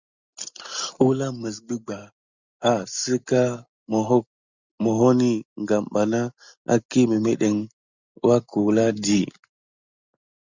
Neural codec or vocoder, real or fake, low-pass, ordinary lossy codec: none; real; 7.2 kHz; Opus, 64 kbps